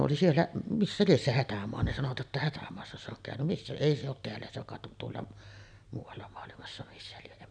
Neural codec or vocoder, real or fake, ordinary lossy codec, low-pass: none; real; none; 9.9 kHz